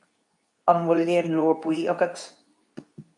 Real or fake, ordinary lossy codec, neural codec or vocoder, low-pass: fake; MP3, 48 kbps; codec, 24 kHz, 0.9 kbps, WavTokenizer, medium speech release version 2; 10.8 kHz